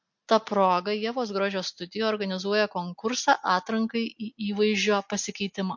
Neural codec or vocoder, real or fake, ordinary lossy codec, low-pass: none; real; MP3, 48 kbps; 7.2 kHz